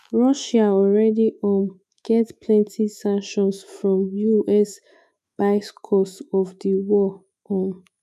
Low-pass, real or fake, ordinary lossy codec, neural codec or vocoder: 14.4 kHz; fake; none; autoencoder, 48 kHz, 128 numbers a frame, DAC-VAE, trained on Japanese speech